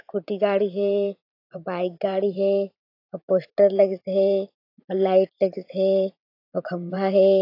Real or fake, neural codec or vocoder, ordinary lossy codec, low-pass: fake; vocoder, 44.1 kHz, 128 mel bands every 512 samples, BigVGAN v2; AAC, 32 kbps; 5.4 kHz